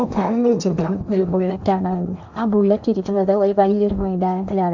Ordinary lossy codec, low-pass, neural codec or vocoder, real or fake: none; 7.2 kHz; codec, 16 kHz in and 24 kHz out, 0.8 kbps, FocalCodec, streaming, 65536 codes; fake